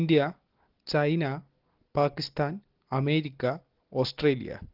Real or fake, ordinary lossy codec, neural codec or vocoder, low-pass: real; Opus, 16 kbps; none; 5.4 kHz